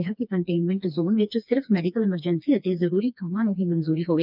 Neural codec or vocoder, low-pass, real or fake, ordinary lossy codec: codec, 16 kHz, 2 kbps, FreqCodec, smaller model; 5.4 kHz; fake; none